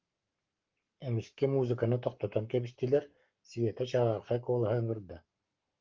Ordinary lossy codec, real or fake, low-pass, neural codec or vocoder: Opus, 32 kbps; fake; 7.2 kHz; codec, 44.1 kHz, 7.8 kbps, Pupu-Codec